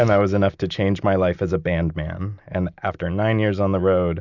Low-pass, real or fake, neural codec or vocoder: 7.2 kHz; real; none